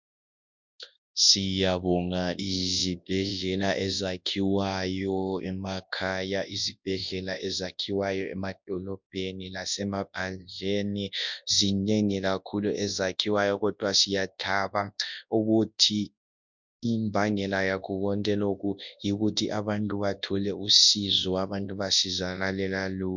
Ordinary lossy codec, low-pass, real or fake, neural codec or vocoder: MP3, 64 kbps; 7.2 kHz; fake; codec, 24 kHz, 0.9 kbps, WavTokenizer, large speech release